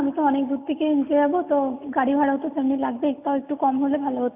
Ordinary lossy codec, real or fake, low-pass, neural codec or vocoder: none; real; 3.6 kHz; none